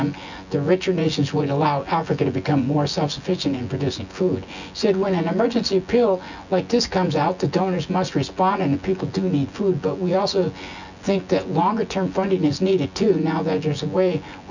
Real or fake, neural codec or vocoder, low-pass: fake; vocoder, 24 kHz, 100 mel bands, Vocos; 7.2 kHz